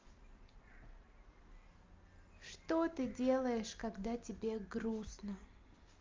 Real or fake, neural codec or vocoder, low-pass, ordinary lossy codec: real; none; 7.2 kHz; Opus, 24 kbps